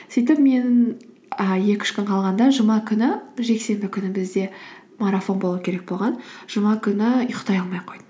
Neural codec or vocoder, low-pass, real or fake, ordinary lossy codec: none; none; real; none